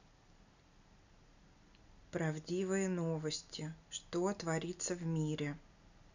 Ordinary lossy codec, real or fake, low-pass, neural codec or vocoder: none; real; 7.2 kHz; none